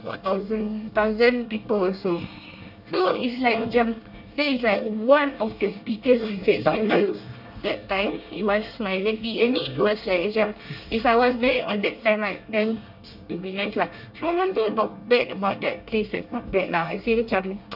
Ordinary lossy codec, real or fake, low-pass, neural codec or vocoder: none; fake; 5.4 kHz; codec, 24 kHz, 1 kbps, SNAC